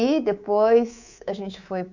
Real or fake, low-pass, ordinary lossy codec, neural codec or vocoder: fake; 7.2 kHz; none; codec, 24 kHz, 3.1 kbps, DualCodec